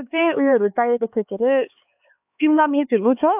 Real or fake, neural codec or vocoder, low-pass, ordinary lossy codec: fake; codec, 16 kHz, 4 kbps, X-Codec, HuBERT features, trained on LibriSpeech; 3.6 kHz; AAC, 32 kbps